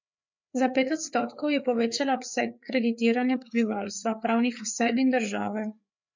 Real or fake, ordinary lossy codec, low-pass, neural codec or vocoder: fake; MP3, 48 kbps; 7.2 kHz; codec, 16 kHz, 4 kbps, FreqCodec, larger model